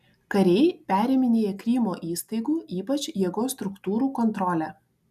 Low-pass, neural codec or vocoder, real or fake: 14.4 kHz; none; real